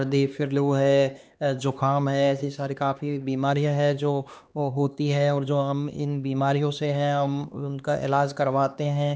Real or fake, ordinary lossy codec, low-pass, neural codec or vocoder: fake; none; none; codec, 16 kHz, 2 kbps, X-Codec, HuBERT features, trained on LibriSpeech